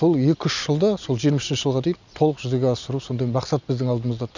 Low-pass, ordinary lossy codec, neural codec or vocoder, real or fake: 7.2 kHz; none; none; real